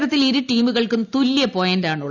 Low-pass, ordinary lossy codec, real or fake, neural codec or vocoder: 7.2 kHz; none; real; none